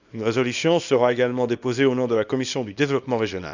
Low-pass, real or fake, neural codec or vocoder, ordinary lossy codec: 7.2 kHz; fake; codec, 24 kHz, 0.9 kbps, WavTokenizer, small release; none